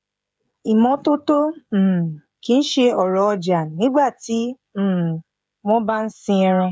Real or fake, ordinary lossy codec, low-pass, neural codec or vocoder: fake; none; none; codec, 16 kHz, 16 kbps, FreqCodec, smaller model